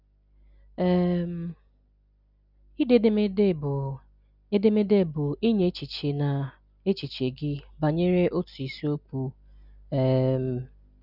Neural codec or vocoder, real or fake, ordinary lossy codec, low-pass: none; real; none; 5.4 kHz